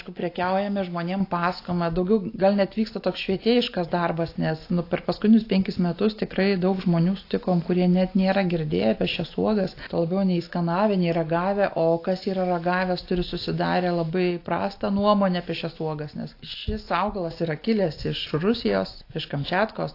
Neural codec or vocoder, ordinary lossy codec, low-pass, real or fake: none; AAC, 32 kbps; 5.4 kHz; real